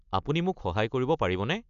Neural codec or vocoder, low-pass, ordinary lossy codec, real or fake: none; 7.2 kHz; MP3, 96 kbps; real